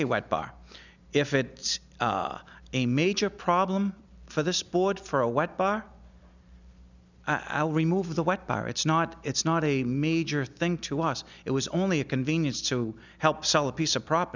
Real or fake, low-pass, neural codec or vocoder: real; 7.2 kHz; none